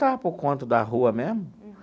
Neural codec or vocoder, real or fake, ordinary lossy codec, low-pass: none; real; none; none